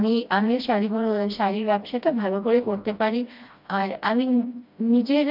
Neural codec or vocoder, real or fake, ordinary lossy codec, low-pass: codec, 16 kHz, 1 kbps, FreqCodec, smaller model; fake; MP3, 48 kbps; 5.4 kHz